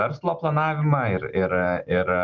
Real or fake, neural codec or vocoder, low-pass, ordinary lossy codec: real; none; 7.2 kHz; Opus, 24 kbps